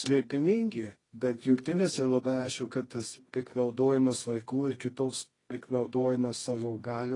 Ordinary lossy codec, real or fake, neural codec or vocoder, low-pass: AAC, 32 kbps; fake; codec, 24 kHz, 0.9 kbps, WavTokenizer, medium music audio release; 10.8 kHz